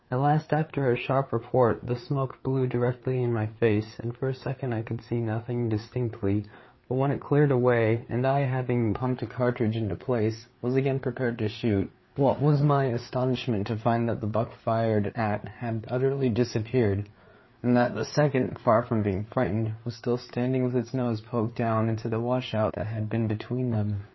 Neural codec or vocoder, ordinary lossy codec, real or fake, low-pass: codec, 16 kHz, 4 kbps, FreqCodec, larger model; MP3, 24 kbps; fake; 7.2 kHz